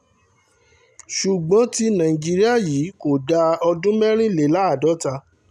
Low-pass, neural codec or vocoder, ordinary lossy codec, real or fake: none; none; none; real